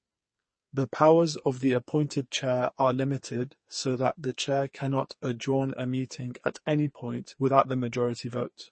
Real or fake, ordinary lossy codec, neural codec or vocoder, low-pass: fake; MP3, 32 kbps; codec, 44.1 kHz, 2.6 kbps, SNAC; 9.9 kHz